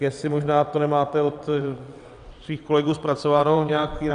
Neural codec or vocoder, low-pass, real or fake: vocoder, 22.05 kHz, 80 mel bands, WaveNeXt; 9.9 kHz; fake